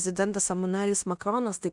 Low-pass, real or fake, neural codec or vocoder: 10.8 kHz; fake; codec, 16 kHz in and 24 kHz out, 0.9 kbps, LongCat-Audio-Codec, fine tuned four codebook decoder